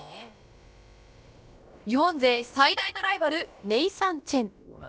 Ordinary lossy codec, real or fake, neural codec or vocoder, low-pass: none; fake; codec, 16 kHz, about 1 kbps, DyCAST, with the encoder's durations; none